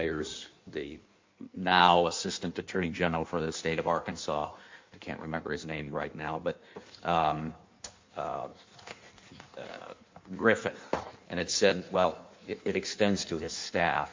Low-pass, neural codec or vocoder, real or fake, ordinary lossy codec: 7.2 kHz; codec, 16 kHz in and 24 kHz out, 1.1 kbps, FireRedTTS-2 codec; fake; MP3, 48 kbps